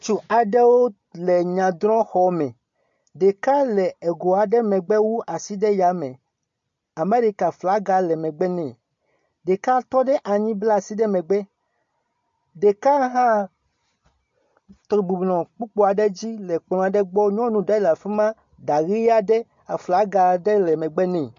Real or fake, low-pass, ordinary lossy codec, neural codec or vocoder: real; 7.2 kHz; MP3, 48 kbps; none